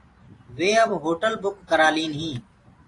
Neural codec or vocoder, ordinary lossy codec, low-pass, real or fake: vocoder, 44.1 kHz, 128 mel bands every 512 samples, BigVGAN v2; AAC, 48 kbps; 10.8 kHz; fake